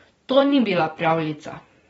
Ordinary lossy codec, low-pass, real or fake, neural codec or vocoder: AAC, 24 kbps; 19.8 kHz; fake; vocoder, 44.1 kHz, 128 mel bands every 512 samples, BigVGAN v2